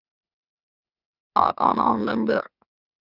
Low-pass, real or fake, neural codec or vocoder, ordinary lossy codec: 5.4 kHz; fake; autoencoder, 44.1 kHz, a latent of 192 numbers a frame, MeloTTS; Opus, 64 kbps